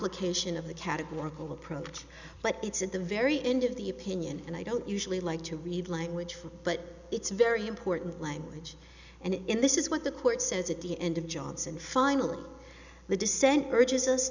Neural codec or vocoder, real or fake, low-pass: none; real; 7.2 kHz